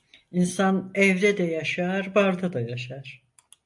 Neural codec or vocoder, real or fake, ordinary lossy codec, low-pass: none; real; AAC, 64 kbps; 10.8 kHz